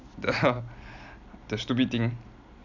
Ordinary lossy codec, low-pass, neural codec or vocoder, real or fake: none; 7.2 kHz; none; real